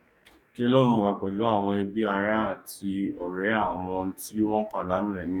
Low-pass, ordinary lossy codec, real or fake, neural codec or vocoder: 19.8 kHz; none; fake; codec, 44.1 kHz, 2.6 kbps, DAC